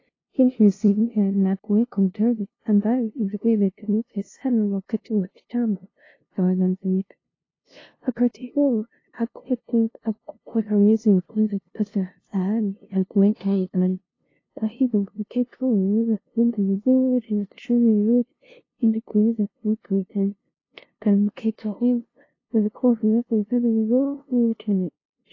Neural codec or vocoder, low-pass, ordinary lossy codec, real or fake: codec, 16 kHz, 0.5 kbps, FunCodec, trained on LibriTTS, 25 frames a second; 7.2 kHz; AAC, 32 kbps; fake